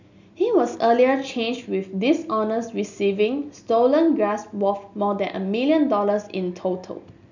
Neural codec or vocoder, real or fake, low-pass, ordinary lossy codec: none; real; 7.2 kHz; none